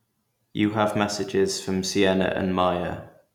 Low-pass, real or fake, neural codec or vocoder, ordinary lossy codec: 19.8 kHz; real; none; none